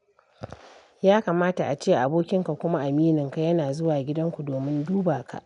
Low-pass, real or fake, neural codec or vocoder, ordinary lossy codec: 10.8 kHz; real; none; none